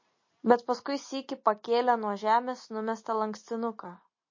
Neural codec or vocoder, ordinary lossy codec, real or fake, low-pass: none; MP3, 32 kbps; real; 7.2 kHz